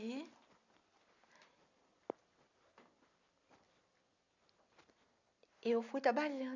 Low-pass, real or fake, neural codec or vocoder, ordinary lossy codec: 7.2 kHz; real; none; none